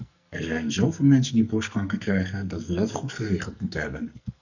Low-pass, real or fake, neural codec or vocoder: 7.2 kHz; fake; codec, 44.1 kHz, 2.6 kbps, SNAC